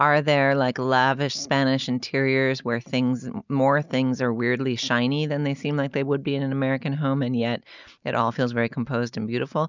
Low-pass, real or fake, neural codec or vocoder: 7.2 kHz; real; none